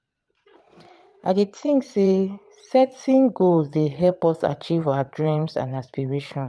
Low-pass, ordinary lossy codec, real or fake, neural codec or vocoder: none; none; fake; vocoder, 22.05 kHz, 80 mel bands, Vocos